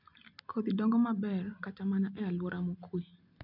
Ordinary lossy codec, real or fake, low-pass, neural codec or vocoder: none; real; 5.4 kHz; none